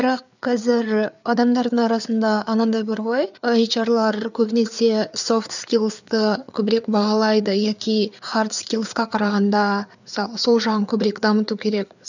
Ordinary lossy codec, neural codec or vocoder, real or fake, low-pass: none; codec, 16 kHz, 4 kbps, FreqCodec, larger model; fake; 7.2 kHz